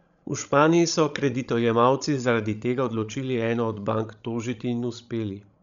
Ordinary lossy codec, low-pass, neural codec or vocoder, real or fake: none; 7.2 kHz; codec, 16 kHz, 16 kbps, FreqCodec, larger model; fake